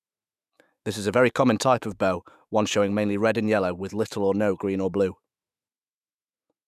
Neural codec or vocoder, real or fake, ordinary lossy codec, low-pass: autoencoder, 48 kHz, 128 numbers a frame, DAC-VAE, trained on Japanese speech; fake; none; 14.4 kHz